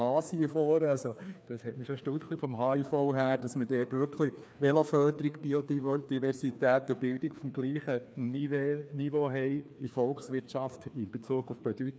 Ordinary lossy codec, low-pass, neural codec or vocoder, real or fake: none; none; codec, 16 kHz, 2 kbps, FreqCodec, larger model; fake